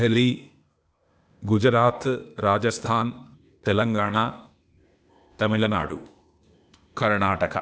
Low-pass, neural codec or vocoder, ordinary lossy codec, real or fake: none; codec, 16 kHz, 0.8 kbps, ZipCodec; none; fake